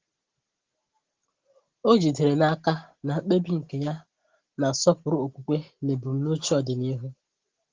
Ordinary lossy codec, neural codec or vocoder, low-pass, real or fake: Opus, 16 kbps; none; 7.2 kHz; real